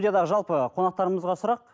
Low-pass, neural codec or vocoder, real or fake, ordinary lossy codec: none; none; real; none